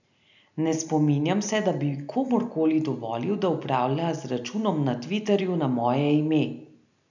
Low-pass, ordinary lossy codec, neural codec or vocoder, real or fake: 7.2 kHz; none; none; real